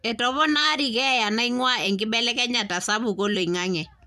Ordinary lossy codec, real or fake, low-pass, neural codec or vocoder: none; fake; 14.4 kHz; vocoder, 44.1 kHz, 128 mel bands every 256 samples, BigVGAN v2